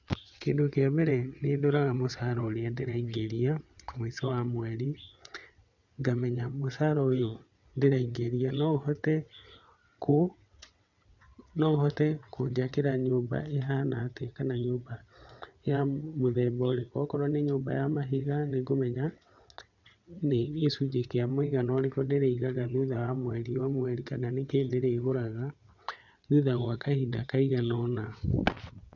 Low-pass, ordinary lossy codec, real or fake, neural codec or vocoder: 7.2 kHz; none; fake; vocoder, 22.05 kHz, 80 mel bands, WaveNeXt